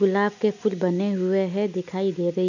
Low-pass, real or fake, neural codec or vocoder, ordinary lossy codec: 7.2 kHz; real; none; none